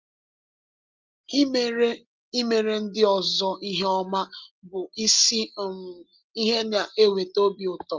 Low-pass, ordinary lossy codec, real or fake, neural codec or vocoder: 7.2 kHz; Opus, 32 kbps; real; none